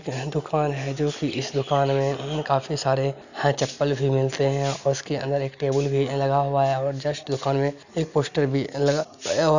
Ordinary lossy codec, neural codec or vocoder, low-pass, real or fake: none; none; 7.2 kHz; real